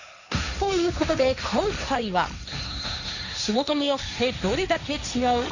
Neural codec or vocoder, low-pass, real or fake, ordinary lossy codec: codec, 16 kHz, 1.1 kbps, Voila-Tokenizer; 7.2 kHz; fake; none